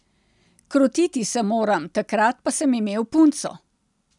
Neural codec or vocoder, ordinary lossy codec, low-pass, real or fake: none; none; 10.8 kHz; real